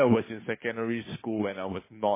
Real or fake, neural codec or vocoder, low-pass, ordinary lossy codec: fake; codec, 16 kHz, 2 kbps, FunCodec, trained on Chinese and English, 25 frames a second; 3.6 kHz; MP3, 16 kbps